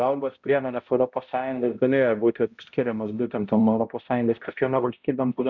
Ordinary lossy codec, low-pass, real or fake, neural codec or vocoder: Opus, 64 kbps; 7.2 kHz; fake; codec, 16 kHz, 0.5 kbps, X-Codec, HuBERT features, trained on balanced general audio